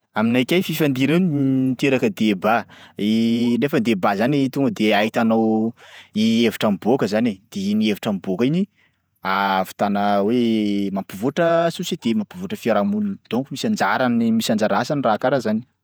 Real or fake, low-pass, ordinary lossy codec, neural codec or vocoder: fake; none; none; vocoder, 48 kHz, 128 mel bands, Vocos